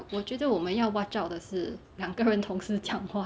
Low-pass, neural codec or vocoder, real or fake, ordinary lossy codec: none; none; real; none